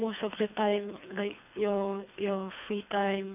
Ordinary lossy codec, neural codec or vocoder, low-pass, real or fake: none; codec, 24 kHz, 3 kbps, HILCodec; 3.6 kHz; fake